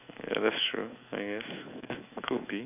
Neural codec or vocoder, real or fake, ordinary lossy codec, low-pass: autoencoder, 48 kHz, 128 numbers a frame, DAC-VAE, trained on Japanese speech; fake; none; 3.6 kHz